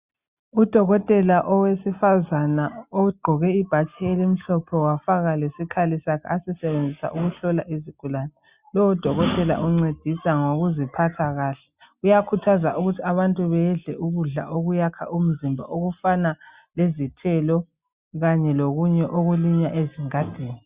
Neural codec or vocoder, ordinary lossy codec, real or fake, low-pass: none; Opus, 64 kbps; real; 3.6 kHz